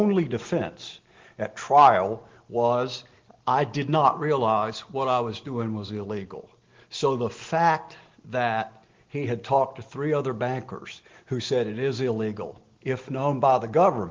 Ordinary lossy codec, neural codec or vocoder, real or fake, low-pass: Opus, 16 kbps; none; real; 7.2 kHz